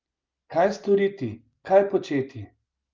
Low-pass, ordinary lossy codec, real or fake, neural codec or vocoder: 7.2 kHz; Opus, 24 kbps; real; none